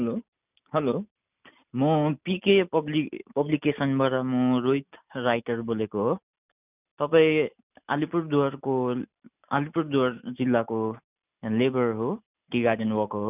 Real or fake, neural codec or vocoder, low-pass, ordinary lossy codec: real; none; 3.6 kHz; none